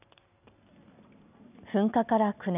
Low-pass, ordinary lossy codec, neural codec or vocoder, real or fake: 3.6 kHz; none; none; real